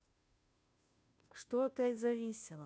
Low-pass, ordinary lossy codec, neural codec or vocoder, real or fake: none; none; codec, 16 kHz, 0.5 kbps, FunCodec, trained on Chinese and English, 25 frames a second; fake